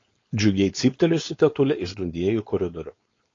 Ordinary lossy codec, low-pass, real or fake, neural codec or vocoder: AAC, 32 kbps; 7.2 kHz; fake; codec, 16 kHz, 4.8 kbps, FACodec